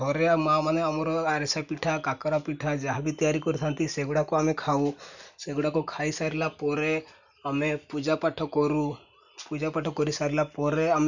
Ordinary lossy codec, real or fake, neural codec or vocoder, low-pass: none; real; none; 7.2 kHz